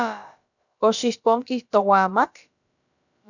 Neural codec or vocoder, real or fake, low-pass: codec, 16 kHz, about 1 kbps, DyCAST, with the encoder's durations; fake; 7.2 kHz